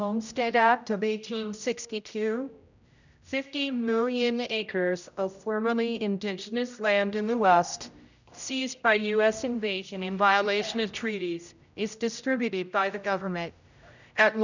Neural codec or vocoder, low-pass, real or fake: codec, 16 kHz, 0.5 kbps, X-Codec, HuBERT features, trained on general audio; 7.2 kHz; fake